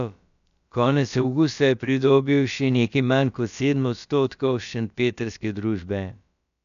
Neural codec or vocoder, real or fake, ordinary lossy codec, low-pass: codec, 16 kHz, about 1 kbps, DyCAST, with the encoder's durations; fake; none; 7.2 kHz